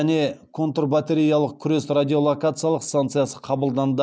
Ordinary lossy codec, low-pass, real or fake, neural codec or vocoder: none; none; real; none